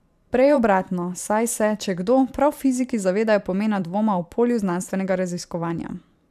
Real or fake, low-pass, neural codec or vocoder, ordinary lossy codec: fake; 14.4 kHz; vocoder, 44.1 kHz, 128 mel bands every 512 samples, BigVGAN v2; AAC, 96 kbps